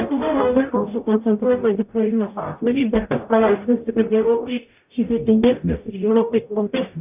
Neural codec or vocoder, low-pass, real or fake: codec, 44.1 kHz, 0.9 kbps, DAC; 3.6 kHz; fake